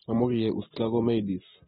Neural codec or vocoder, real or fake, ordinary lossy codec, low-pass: none; real; AAC, 16 kbps; 7.2 kHz